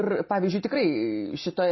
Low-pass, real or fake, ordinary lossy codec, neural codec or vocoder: 7.2 kHz; real; MP3, 24 kbps; none